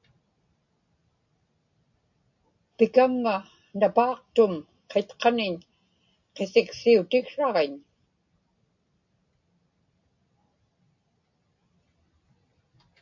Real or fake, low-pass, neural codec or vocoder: real; 7.2 kHz; none